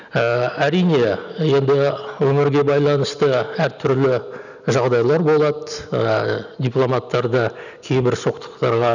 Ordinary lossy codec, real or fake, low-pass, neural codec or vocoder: none; real; 7.2 kHz; none